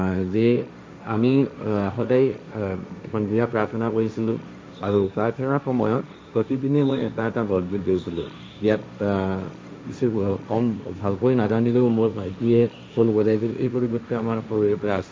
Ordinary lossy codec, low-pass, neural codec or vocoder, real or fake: none; none; codec, 16 kHz, 1.1 kbps, Voila-Tokenizer; fake